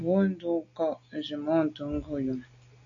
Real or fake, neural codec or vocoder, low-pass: real; none; 7.2 kHz